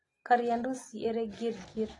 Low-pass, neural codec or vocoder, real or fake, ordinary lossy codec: 10.8 kHz; none; real; AAC, 32 kbps